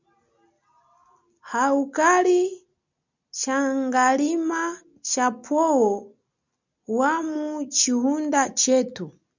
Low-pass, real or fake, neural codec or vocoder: 7.2 kHz; real; none